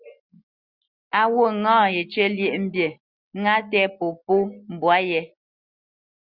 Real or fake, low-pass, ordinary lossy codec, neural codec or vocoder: real; 5.4 kHz; Opus, 64 kbps; none